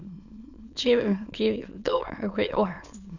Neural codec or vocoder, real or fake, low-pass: autoencoder, 22.05 kHz, a latent of 192 numbers a frame, VITS, trained on many speakers; fake; 7.2 kHz